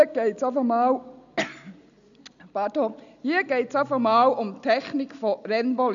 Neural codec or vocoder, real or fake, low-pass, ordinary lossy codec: none; real; 7.2 kHz; none